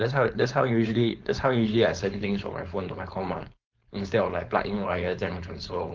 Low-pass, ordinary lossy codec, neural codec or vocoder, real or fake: 7.2 kHz; Opus, 24 kbps; codec, 16 kHz, 4.8 kbps, FACodec; fake